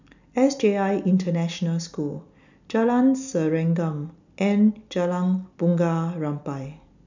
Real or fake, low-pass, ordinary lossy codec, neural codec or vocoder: real; 7.2 kHz; none; none